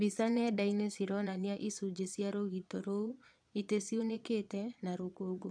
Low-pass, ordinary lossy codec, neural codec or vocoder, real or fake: 9.9 kHz; none; vocoder, 22.05 kHz, 80 mel bands, Vocos; fake